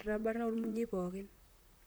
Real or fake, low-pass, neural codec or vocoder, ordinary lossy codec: fake; none; vocoder, 44.1 kHz, 128 mel bands, Pupu-Vocoder; none